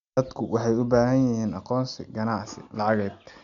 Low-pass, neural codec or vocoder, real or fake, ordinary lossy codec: 7.2 kHz; none; real; none